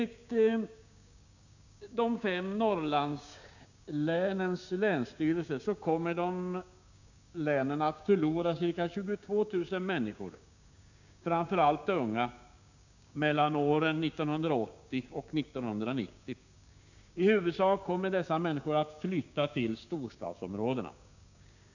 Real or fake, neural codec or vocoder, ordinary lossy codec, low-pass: fake; codec, 16 kHz, 6 kbps, DAC; none; 7.2 kHz